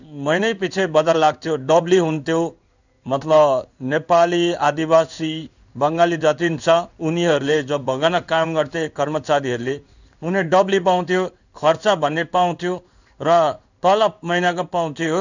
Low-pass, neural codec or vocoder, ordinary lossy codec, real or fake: 7.2 kHz; codec, 16 kHz in and 24 kHz out, 1 kbps, XY-Tokenizer; none; fake